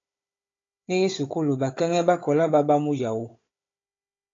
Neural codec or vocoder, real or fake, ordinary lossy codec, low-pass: codec, 16 kHz, 16 kbps, FunCodec, trained on Chinese and English, 50 frames a second; fake; AAC, 32 kbps; 7.2 kHz